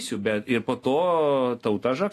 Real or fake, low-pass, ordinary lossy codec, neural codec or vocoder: real; 14.4 kHz; AAC, 48 kbps; none